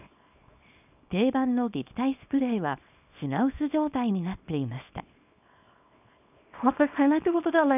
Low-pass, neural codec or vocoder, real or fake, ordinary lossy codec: 3.6 kHz; codec, 24 kHz, 0.9 kbps, WavTokenizer, small release; fake; none